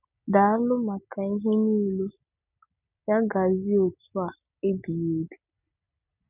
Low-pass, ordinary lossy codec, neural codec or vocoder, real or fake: 3.6 kHz; none; none; real